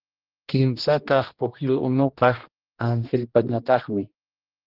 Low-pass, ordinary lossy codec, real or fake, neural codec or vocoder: 5.4 kHz; Opus, 16 kbps; fake; codec, 16 kHz, 1 kbps, X-Codec, HuBERT features, trained on general audio